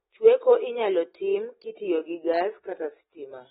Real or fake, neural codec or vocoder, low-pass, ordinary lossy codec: real; none; 19.8 kHz; AAC, 16 kbps